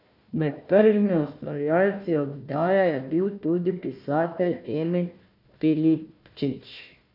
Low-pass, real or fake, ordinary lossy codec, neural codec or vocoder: 5.4 kHz; fake; none; codec, 16 kHz, 1 kbps, FunCodec, trained on Chinese and English, 50 frames a second